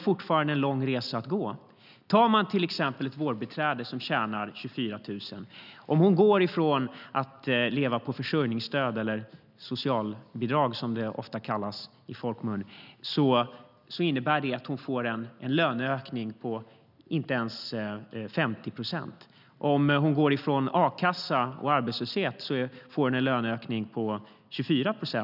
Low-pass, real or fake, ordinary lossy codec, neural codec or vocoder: 5.4 kHz; real; none; none